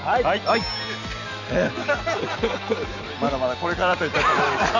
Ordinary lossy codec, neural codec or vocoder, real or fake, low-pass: none; none; real; 7.2 kHz